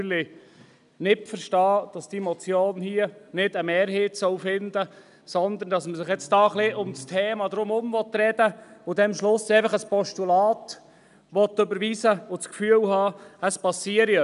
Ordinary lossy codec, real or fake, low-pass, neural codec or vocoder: none; real; 10.8 kHz; none